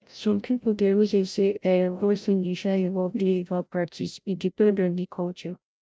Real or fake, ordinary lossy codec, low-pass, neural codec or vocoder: fake; none; none; codec, 16 kHz, 0.5 kbps, FreqCodec, larger model